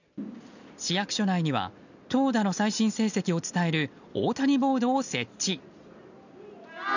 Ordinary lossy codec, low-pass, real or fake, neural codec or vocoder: none; 7.2 kHz; real; none